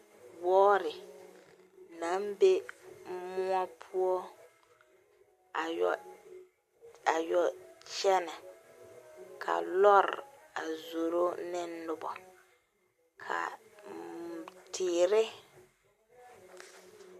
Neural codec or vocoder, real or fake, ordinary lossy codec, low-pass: none; real; MP3, 64 kbps; 14.4 kHz